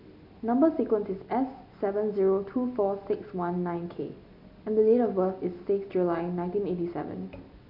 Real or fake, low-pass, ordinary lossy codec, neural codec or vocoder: real; 5.4 kHz; none; none